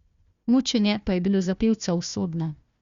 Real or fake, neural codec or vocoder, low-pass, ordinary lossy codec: fake; codec, 16 kHz, 1 kbps, FunCodec, trained on Chinese and English, 50 frames a second; 7.2 kHz; Opus, 64 kbps